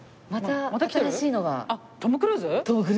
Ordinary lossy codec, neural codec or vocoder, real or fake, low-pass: none; none; real; none